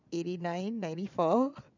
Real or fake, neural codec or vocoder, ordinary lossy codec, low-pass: fake; vocoder, 22.05 kHz, 80 mel bands, Vocos; none; 7.2 kHz